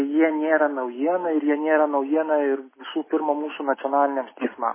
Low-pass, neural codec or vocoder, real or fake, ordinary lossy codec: 3.6 kHz; none; real; MP3, 16 kbps